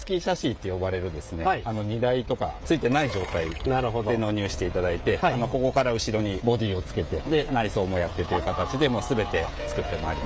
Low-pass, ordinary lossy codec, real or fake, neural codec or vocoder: none; none; fake; codec, 16 kHz, 16 kbps, FreqCodec, smaller model